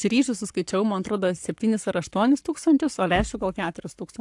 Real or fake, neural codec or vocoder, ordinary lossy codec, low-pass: fake; codec, 44.1 kHz, 7.8 kbps, Pupu-Codec; AAC, 64 kbps; 10.8 kHz